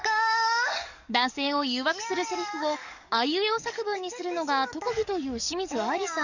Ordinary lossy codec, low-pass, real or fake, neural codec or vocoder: none; 7.2 kHz; fake; codec, 44.1 kHz, 7.8 kbps, DAC